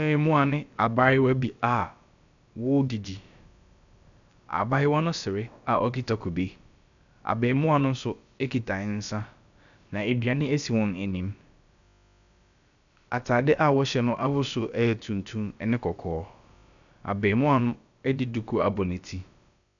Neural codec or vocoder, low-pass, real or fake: codec, 16 kHz, about 1 kbps, DyCAST, with the encoder's durations; 7.2 kHz; fake